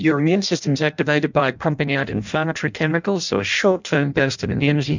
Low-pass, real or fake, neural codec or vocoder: 7.2 kHz; fake; codec, 16 kHz in and 24 kHz out, 0.6 kbps, FireRedTTS-2 codec